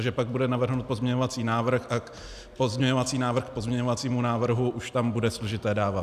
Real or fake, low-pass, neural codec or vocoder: real; 14.4 kHz; none